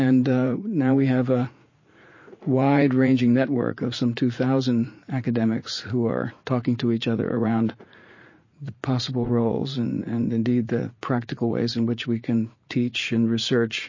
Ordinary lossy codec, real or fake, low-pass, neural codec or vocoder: MP3, 32 kbps; fake; 7.2 kHz; vocoder, 44.1 kHz, 80 mel bands, Vocos